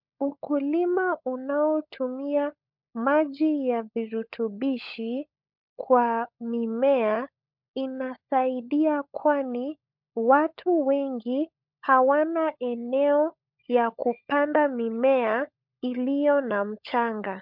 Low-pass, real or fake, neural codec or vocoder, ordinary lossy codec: 5.4 kHz; fake; codec, 16 kHz, 16 kbps, FunCodec, trained on LibriTTS, 50 frames a second; MP3, 48 kbps